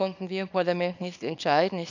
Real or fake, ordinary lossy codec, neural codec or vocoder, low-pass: fake; none; codec, 24 kHz, 0.9 kbps, WavTokenizer, small release; 7.2 kHz